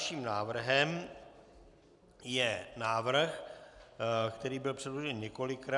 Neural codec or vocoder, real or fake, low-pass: none; real; 10.8 kHz